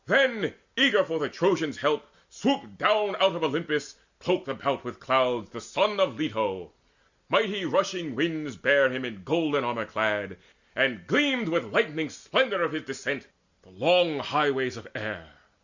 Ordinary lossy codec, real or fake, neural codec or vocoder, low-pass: Opus, 64 kbps; real; none; 7.2 kHz